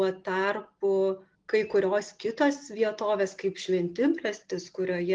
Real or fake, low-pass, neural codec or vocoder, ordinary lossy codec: real; 9.9 kHz; none; Opus, 24 kbps